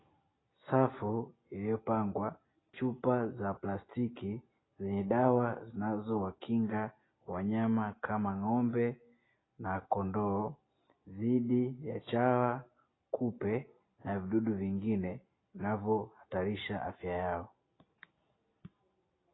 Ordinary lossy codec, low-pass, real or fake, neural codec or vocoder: AAC, 16 kbps; 7.2 kHz; real; none